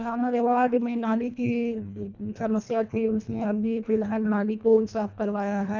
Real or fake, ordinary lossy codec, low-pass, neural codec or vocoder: fake; none; 7.2 kHz; codec, 24 kHz, 1.5 kbps, HILCodec